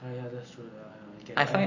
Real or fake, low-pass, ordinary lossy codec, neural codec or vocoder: real; 7.2 kHz; AAC, 48 kbps; none